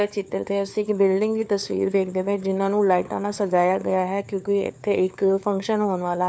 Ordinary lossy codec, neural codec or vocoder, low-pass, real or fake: none; codec, 16 kHz, 4 kbps, FunCodec, trained on LibriTTS, 50 frames a second; none; fake